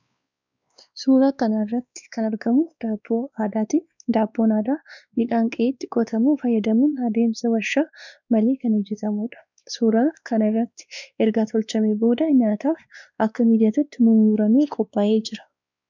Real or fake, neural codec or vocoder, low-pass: fake; codec, 16 kHz, 2 kbps, X-Codec, WavLM features, trained on Multilingual LibriSpeech; 7.2 kHz